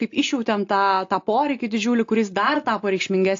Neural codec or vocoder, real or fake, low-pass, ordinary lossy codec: none; real; 7.2 kHz; AAC, 48 kbps